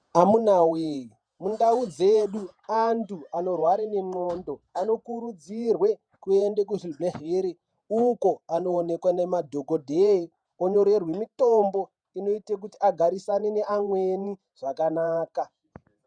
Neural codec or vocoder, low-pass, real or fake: vocoder, 48 kHz, 128 mel bands, Vocos; 9.9 kHz; fake